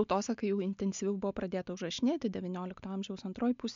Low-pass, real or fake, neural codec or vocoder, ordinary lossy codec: 7.2 kHz; real; none; MP3, 64 kbps